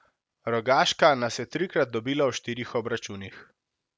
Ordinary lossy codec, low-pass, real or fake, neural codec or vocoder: none; none; real; none